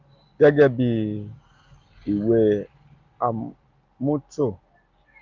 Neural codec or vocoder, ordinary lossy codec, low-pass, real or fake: none; Opus, 24 kbps; 7.2 kHz; real